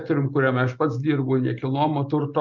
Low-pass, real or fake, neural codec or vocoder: 7.2 kHz; real; none